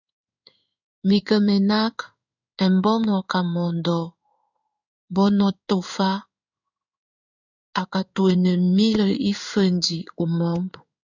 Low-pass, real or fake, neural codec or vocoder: 7.2 kHz; fake; codec, 16 kHz in and 24 kHz out, 1 kbps, XY-Tokenizer